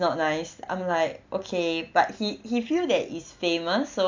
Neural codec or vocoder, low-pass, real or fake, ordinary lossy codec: none; 7.2 kHz; real; MP3, 64 kbps